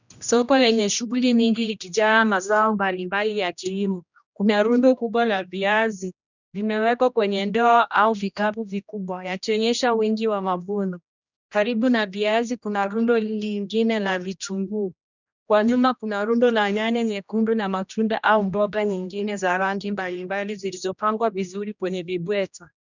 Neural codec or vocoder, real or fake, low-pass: codec, 16 kHz, 1 kbps, X-Codec, HuBERT features, trained on general audio; fake; 7.2 kHz